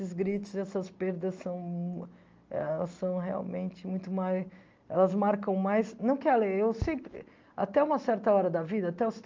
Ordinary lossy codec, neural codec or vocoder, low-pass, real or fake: Opus, 24 kbps; none; 7.2 kHz; real